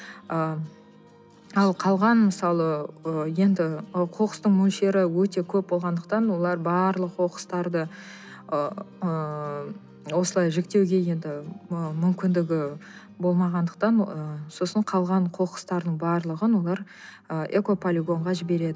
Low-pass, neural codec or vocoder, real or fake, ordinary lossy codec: none; none; real; none